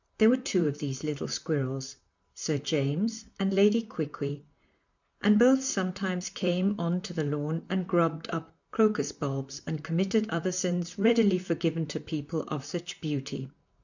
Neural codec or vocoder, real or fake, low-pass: vocoder, 44.1 kHz, 128 mel bands, Pupu-Vocoder; fake; 7.2 kHz